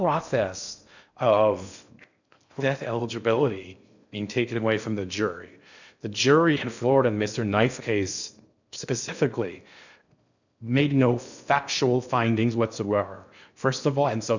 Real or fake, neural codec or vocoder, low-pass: fake; codec, 16 kHz in and 24 kHz out, 0.6 kbps, FocalCodec, streaming, 2048 codes; 7.2 kHz